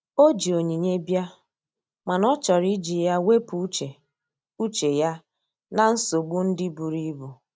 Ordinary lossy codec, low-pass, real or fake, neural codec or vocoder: none; none; real; none